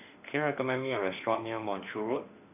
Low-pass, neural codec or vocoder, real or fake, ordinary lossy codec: 3.6 kHz; autoencoder, 48 kHz, 32 numbers a frame, DAC-VAE, trained on Japanese speech; fake; none